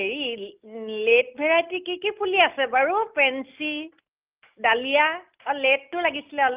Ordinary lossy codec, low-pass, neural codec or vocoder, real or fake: Opus, 24 kbps; 3.6 kHz; none; real